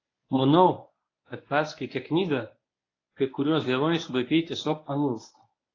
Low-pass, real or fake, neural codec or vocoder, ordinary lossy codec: 7.2 kHz; fake; codec, 24 kHz, 0.9 kbps, WavTokenizer, medium speech release version 1; AAC, 32 kbps